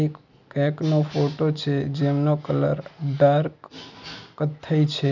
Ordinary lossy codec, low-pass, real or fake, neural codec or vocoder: none; 7.2 kHz; real; none